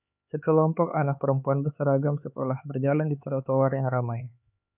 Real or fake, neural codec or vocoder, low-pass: fake; codec, 16 kHz, 4 kbps, X-Codec, HuBERT features, trained on LibriSpeech; 3.6 kHz